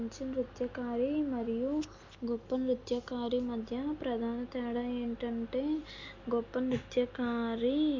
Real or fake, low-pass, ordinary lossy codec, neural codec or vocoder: real; 7.2 kHz; MP3, 64 kbps; none